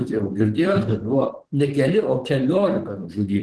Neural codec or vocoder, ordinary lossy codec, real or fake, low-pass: vocoder, 44.1 kHz, 128 mel bands, Pupu-Vocoder; Opus, 16 kbps; fake; 10.8 kHz